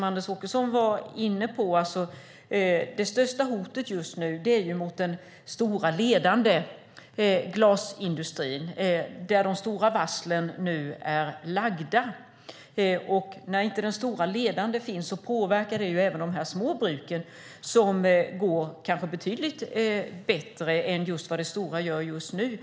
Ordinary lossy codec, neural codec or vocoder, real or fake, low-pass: none; none; real; none